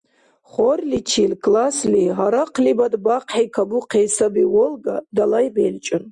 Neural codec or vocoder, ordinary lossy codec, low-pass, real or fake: none; Opus, 64 kbps; 10.8 kHz; real